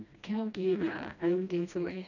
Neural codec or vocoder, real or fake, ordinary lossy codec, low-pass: codec, 16 kHz, 1 kbps, FreqCodec, smaller model; fake; none; 7.2 kHz